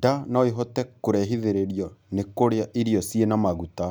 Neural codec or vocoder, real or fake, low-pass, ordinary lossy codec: none; real; none; none